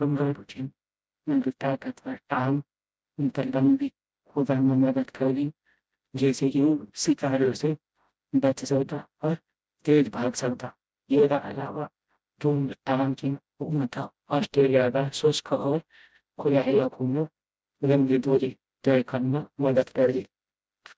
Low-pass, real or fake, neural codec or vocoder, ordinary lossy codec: none; fake; codec, 16 kHz, 0.5 kbps, FreqCodec, smaller model; none